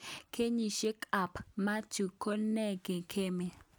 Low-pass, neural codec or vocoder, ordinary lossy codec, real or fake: none; none; none; real